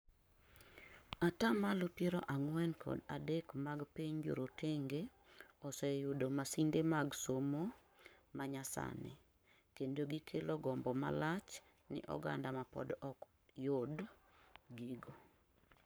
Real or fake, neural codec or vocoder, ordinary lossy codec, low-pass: fake; codec, 44.1 kHz, 7.8 kbps, Pupu-Codec; none; none